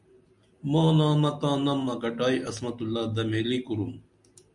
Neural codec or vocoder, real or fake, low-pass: none; real; 10.8 kHz